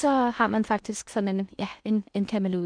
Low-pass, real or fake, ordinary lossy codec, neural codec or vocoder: 9.9 kHz; fake; none; codec, 16 kHz in and 24 kHz out, 0.8 kbps, FocalCodec, streaming, 65536 codes